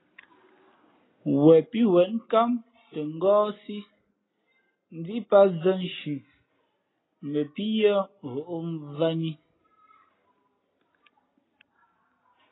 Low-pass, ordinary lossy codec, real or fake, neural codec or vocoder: 7.2 kHz; AAC, 16 kbps; real; none